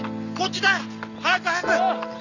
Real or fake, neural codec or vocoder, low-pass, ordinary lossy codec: real; none; 7.2 kHz; none